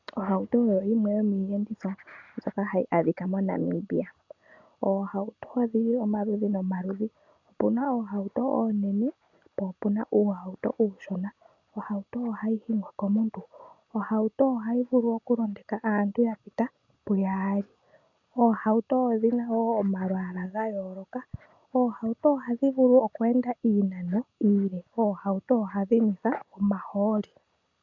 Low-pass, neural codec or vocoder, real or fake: 7.2 kHz; none; real